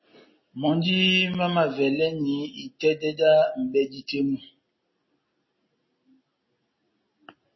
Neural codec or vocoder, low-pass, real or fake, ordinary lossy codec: none; 7.2 kHz; real; MP3, 24 kbps